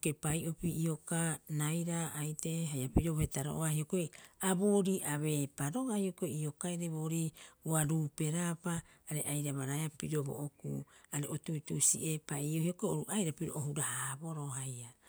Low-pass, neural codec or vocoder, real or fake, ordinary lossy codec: none; none; real; none